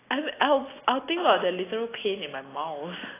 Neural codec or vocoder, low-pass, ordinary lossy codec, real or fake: none; 3.6 kHz; AAC, 16 kbps; real